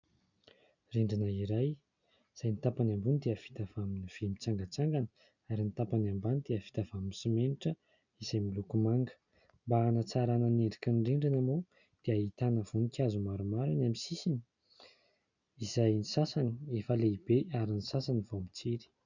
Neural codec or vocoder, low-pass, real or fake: none; 7.2 kHz; real